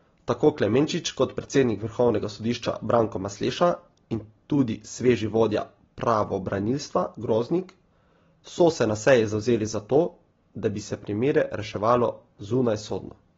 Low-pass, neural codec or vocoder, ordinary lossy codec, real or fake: 7.2 kHz; none; AAC, 24 kbps; real